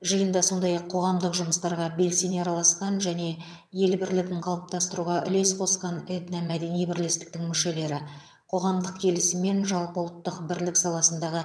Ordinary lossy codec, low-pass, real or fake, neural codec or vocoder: none; none; fake; vocoder, 22.05 kHz, 80 mel bands, HiFi-GAN